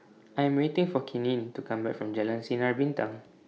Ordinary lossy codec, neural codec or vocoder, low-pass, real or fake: none; none; none; real